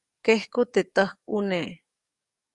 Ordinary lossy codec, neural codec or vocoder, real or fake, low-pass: Opus, 64 kbps; codec, 44.1 kHz, 7.8 kbps, DAC; fake; 10.8 kHz